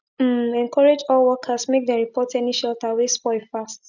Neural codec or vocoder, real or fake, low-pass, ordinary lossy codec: none; real; 7.2 kHz; none